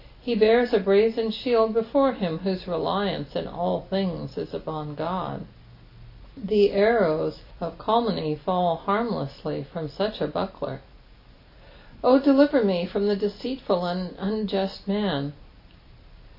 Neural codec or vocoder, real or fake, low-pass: none; real; 5.4 kHz